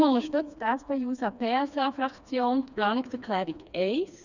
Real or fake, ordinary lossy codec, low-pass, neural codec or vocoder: fake; none; 7.2 kHz; codec, 16 kHz, 2 kbps, FreqCodec, smaller model